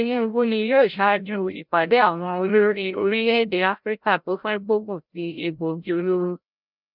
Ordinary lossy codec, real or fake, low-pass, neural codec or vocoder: none; fake; 5.4 kHz; codec, 16 kHz, 0.5 kbps, FreqCodec, larger model